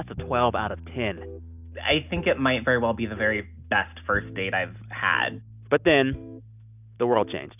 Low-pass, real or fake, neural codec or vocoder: 3.6 kHz; real; none